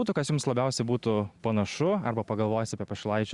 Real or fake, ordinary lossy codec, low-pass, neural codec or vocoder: real; Opus, 64 kbps; 10.8 kHz; none